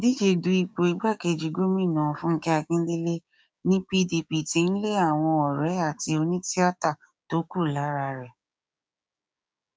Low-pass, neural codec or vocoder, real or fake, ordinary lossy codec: none; codec, 16 kHz, 6 kbps, DAC; fake; none